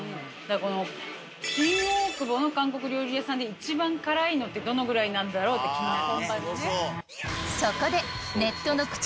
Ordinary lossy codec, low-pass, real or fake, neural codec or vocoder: none; none; real; none